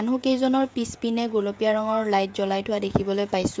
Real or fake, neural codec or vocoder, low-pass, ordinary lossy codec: fake; codec, 16 kHz, 16 kbps, FreqCodec, smaller model; none; none